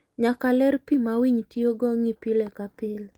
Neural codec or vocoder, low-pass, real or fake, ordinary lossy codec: none; 19.8 kHz; real; Opus, 24 kbps